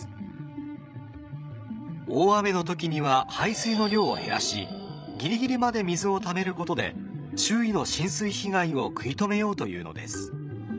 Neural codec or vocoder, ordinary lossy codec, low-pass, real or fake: codec, 16 kHz, 16 kbps, FreqCodec, larger model; none; none; fake